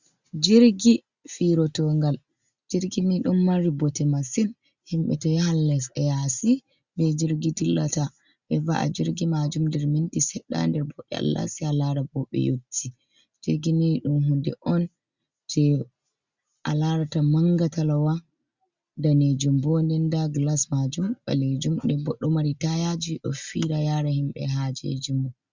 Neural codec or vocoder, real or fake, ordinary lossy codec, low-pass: none; real; Opus, 64 kbps; 7.2 kHz